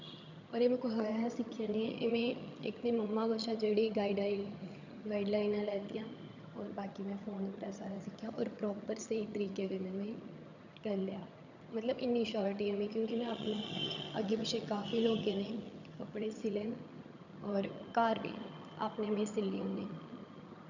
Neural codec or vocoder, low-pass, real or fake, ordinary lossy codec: vocoder, 22.05 kHz, 80 mel bands, HiFi-GAN; 7.2 kHz; fake; none